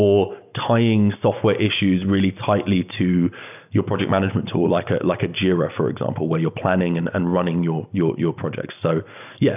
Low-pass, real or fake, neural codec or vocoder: 3.6 kHz; real; none